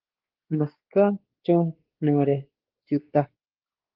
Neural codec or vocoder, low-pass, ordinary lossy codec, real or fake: codec, 16 kHz, 2 kbps, X-Codec, WavLM features, trained on Multilingual LibriSpeech; 5.4 kHz; Opus, 16 kbps; fake